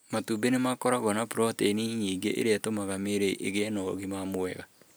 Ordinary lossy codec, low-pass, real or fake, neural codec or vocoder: none; none; real; none